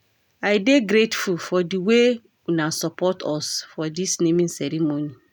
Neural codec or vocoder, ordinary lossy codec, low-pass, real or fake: none; none; none; real